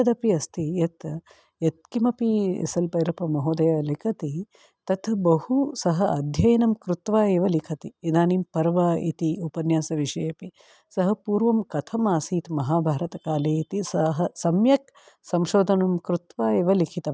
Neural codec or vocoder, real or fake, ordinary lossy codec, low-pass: none; real; none; none